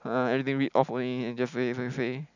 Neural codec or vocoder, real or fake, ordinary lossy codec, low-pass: none; real; none; 7.2 kHz